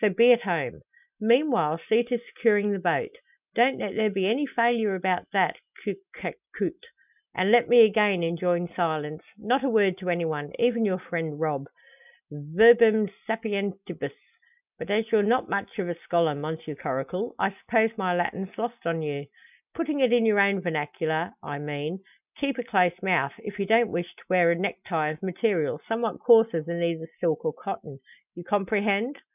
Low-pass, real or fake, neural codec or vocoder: 3.6 kHz; real; none